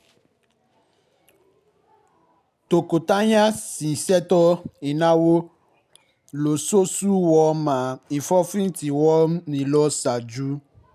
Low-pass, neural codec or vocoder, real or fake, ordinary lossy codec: 14.4 kHz; none; real; none